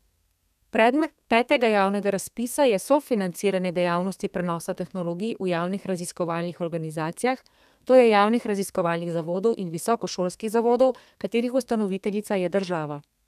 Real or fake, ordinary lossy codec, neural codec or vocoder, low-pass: fake; none; codec, 32 kHz, 1.9 kbps, SNAC; 14.4 kHz